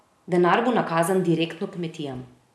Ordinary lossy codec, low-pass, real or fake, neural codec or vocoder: none; none; real; none